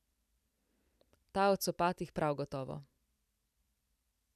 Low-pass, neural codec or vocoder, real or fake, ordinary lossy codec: 14.4 kHz; vocoder, 44.1 kHz, 128 mel bands every 256 samples, BigVGAN v2; fake; none